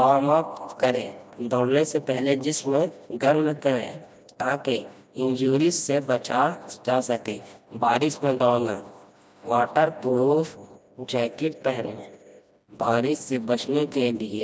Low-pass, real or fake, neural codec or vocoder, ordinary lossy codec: none; fake; codec, 16 kHz, 1 kbps, FreqCodec, smaller model; none